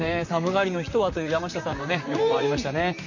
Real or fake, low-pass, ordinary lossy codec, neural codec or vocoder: fake; 7.2 kHz; none; vocoder, 44.1 kHz, 128 mel bands, Pupu-Vocoder